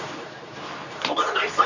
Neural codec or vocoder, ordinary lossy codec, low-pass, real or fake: codec, 24 kHz, 0.9 kbps, WavTokenizer, medium speech release version 2; none; 7.2 kHz; fake